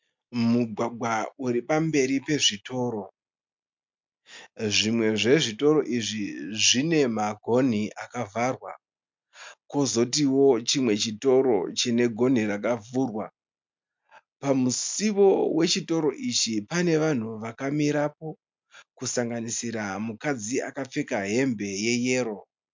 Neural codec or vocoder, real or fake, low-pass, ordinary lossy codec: none; real; 7.2 kHz; MP3, 64 kbps